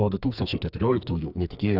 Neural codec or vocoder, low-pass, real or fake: codec, 44.1 kHz, 2.6 kbps, SNAC; 5.4 kHz; fake